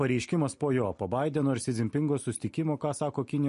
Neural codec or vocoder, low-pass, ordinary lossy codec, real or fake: none; 10.8 kHz; MP3, 48 kbps; real